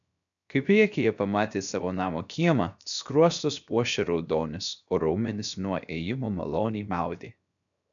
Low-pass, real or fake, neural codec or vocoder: 7.2 kHz; fake; codec, 16 kHz, 0.7 kbps, FocalCodec